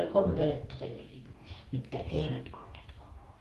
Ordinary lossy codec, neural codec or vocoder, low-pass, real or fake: Opus, 24 kbps; codec, 44.1 kHz, 2.6 kbps, DAC; 14.4 kHz; fake